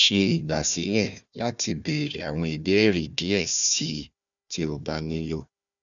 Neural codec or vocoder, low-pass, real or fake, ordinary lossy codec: codec, 16 kHz, 1 kbps, FunCodec, trained on Chinese and English, 50 frames a second; 7.2 kHz; fake; none